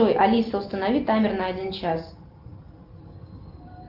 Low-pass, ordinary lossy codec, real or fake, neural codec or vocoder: 5.4 kHz; Opus, 32 kbps; real; none